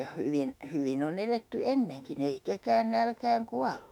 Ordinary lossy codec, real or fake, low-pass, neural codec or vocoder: none; fake; 19.8 kHz; autoencoder, 48 kHz, 32 numbers a frame, DAC-VAE, trained on Japanese speech